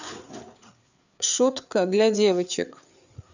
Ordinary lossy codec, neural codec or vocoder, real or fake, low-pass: none; codec, 16 kHz, 4 kbps, FreqCodec, larger model; fake; 7.2 kHz